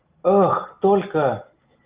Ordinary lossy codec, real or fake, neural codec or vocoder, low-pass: Opus, 24 kbps; real; none; 3.6 kHz